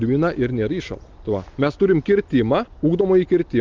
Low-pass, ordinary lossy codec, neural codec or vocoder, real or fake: 7.2 kHz; Opus, 24 kbps; none; real